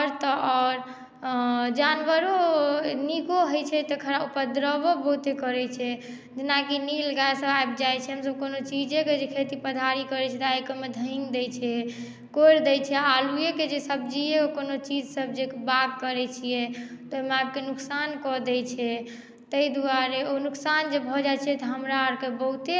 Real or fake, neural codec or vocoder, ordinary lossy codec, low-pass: real; none; none; none